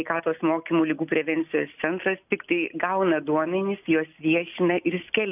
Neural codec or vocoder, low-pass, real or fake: none; 3.6 kHz; real